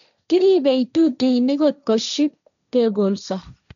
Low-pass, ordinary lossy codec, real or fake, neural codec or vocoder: 7.2 kHz; none; fake; codec, 16 kHz, 1.1 kbps, Voila-Tokenizer